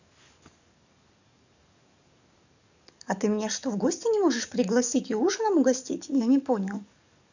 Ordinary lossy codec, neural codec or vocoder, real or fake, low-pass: none; codec, 44.1 kHz, 7.8 kbps, DAC; fake; 7.2 kHz